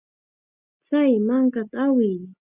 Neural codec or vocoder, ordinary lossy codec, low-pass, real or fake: none; Opus, 64 kbps; 3.6 kHz; real